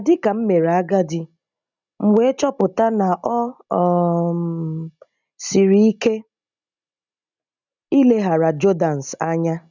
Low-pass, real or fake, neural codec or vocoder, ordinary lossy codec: 7.2 kHz; real; none; none